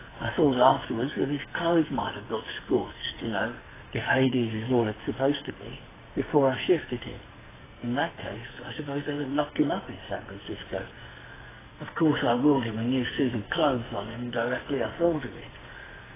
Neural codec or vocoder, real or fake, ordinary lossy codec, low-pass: codec, 44.1 kHz, 2.6 kbps, SNAC; fake; AAC, 16 kbps; 3.6 kHz